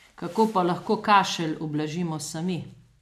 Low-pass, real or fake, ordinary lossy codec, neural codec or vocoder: 14.4 kHz; real; none; none